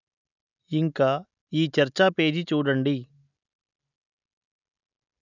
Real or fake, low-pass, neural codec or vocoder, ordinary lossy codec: real; 7.2 kHz; none; none